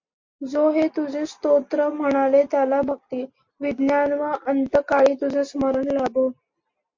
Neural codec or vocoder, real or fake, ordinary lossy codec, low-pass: none; real; MP3, 48 kbps; 7.2 kHz